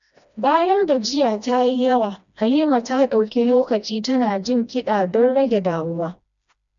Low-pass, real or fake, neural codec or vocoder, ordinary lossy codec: 7.2 kHz; fake; codec, 16 kHz, 1 kbps, FreqCodec, smaller model; none